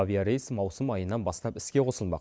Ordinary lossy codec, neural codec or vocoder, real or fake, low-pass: none; none; real; none